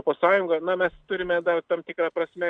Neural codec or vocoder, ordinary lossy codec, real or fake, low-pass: none; MP3, 64 kbps; real; 9.9 kHz